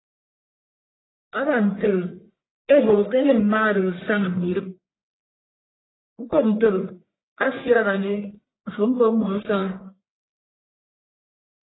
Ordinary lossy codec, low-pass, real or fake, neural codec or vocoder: AAC, 16 kbps; 7.2 kHz; fake; codec, 44.1 kHz, 1.7 kbps, Pupu-Codec